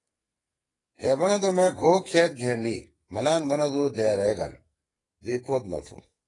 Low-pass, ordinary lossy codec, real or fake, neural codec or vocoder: 10.8 kHz; AAC, 32 kbps; fake; codec, 44.1 kHz, 2.6 kbps, SNAC